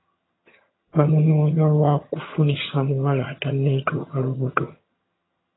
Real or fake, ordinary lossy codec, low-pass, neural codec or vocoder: fake; AAC, 16 kbps; 7.2 kHz; vocoder, 22.05 kHz, 80 mel bands, HiFi-GAN